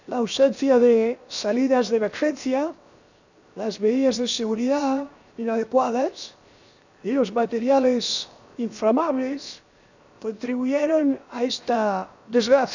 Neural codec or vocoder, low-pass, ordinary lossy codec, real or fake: codec, 16 kHz, 0.7 kbps, FocalCodec; 7.2 kHz; none; fake